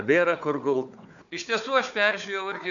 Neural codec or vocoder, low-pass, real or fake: codec, 16 kHz, 4 kbps, FunCodec, trained on Chinese and English, 50 frames a second; 7.2 kHz; fake